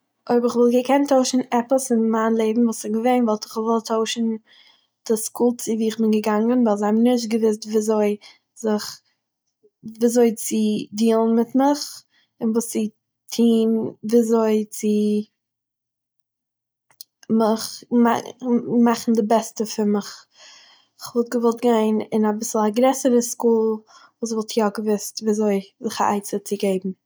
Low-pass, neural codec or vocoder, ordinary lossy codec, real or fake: none; none; none; real